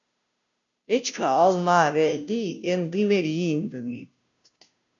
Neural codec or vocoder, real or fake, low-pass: codec, 16 kHz, 0.5 kbps, FunCodec, trained on Chinese and English, 25 frames a second; fake; 7.2 kHz